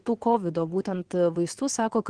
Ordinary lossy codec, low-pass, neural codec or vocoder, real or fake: Opus, 16 kbps; 9.9 kHz; vocoder, 22.05 kHz, 80 mel bands, WaveNeXt; fake